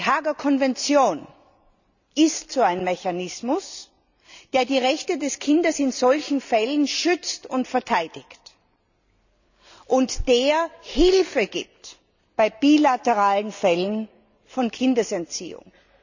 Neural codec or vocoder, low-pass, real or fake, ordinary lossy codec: none; 7.2 kHz; real; none